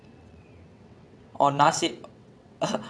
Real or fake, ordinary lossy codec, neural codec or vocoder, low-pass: fake; none; vocoder, 22.05 kHz, 80 mel bands, WaveNeXt; none